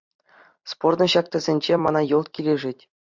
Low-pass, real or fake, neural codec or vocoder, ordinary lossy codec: 7.2 kHz; fake; vocoder, 44.1 kHz, 128 mel bands every 256 samples, BigVGAN v2; MP3, 64 kbps